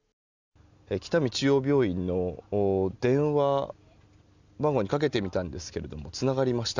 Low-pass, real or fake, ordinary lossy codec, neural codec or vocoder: 7.2 kHz; real; none; none